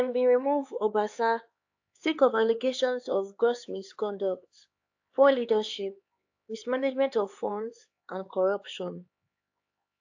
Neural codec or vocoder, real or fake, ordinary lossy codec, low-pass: codec, 16 kHz, 4 kbps, X-Codec, HuBERT features, trained on LibriSpeech; fake; AAC, 48 kbps; 7.2 kHz